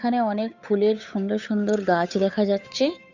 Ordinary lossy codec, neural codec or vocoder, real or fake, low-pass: none; codec, 16 kHz, 8 kbps, FunCodec, trained on Chinese and English, 25 frames a second; fake; 7.2 kHz